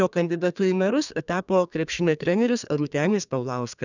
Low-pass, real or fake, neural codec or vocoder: 7.2 kHz; fake; codec, 32 kHz, 1.9 kbps, SNAC